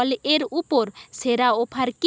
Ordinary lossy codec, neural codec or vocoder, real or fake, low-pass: none; none; real; none